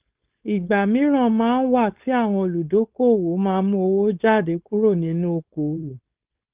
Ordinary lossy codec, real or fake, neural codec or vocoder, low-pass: Opus, 32 kbps; fake; codec, 16 kHz, 4.8 kbps, FACodec; 3.6 kHz